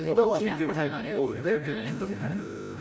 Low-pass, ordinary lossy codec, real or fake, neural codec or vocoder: none; none; fake; codec, 16 kHz, 0.5 kbps, FreqCodec, larger model